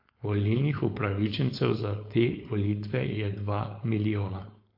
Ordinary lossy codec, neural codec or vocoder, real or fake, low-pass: MP3, 32 kbps; codec, 16 kHz, 4.8 kbps, FACodec; fake; 5.4 kHz